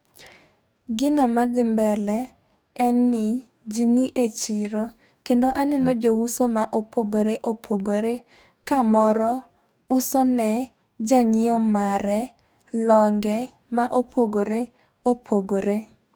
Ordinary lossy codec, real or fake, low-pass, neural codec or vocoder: none; fake; none; codec, 44.1 kHz, 2.6 kbps, DAC